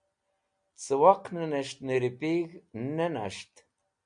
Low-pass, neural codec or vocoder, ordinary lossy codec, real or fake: 9.9 kHz; none; MP3, 96 kbps; real